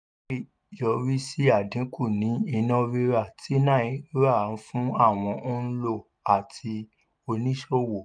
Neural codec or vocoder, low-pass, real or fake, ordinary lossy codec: none; 9.9 kHz; real; none